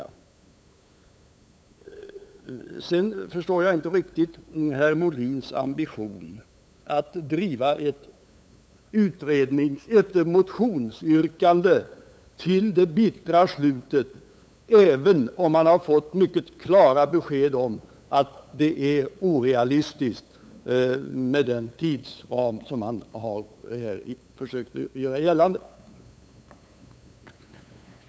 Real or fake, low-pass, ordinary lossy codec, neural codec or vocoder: fake; none; none; codec, 16 kHz, 8 kbps, FunCodec, trained on LibriTTS, 25 frames a second